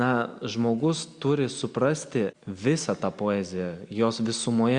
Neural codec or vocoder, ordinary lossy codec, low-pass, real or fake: none; Opus, 64 kbps; 9.9 kHz; real